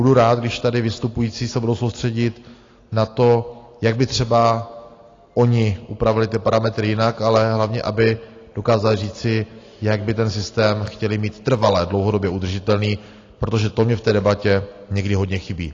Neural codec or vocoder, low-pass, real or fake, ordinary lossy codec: none; 7.2 kHz; real; AAC, 32 kbps